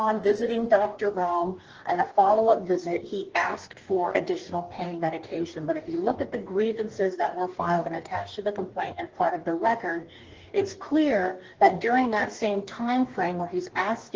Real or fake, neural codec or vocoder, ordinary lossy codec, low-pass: fake; codec, 44.1 kHz, 2.6 kbps, DAC; Opus, 16 kbps; 7.2 kHz